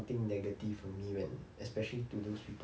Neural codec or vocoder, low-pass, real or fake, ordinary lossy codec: none; none; real; none